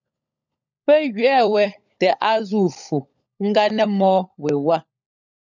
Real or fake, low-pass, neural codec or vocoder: fake; 7.2 kHz; codec, 16 kHz, 16 kbps, FunCodec, trained on LibriTTS, 50 frames a second